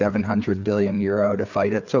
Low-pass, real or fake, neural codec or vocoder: 7.2 kHz; fake; codec, 16 kHz, 4 kbps, FreqCodec, larger model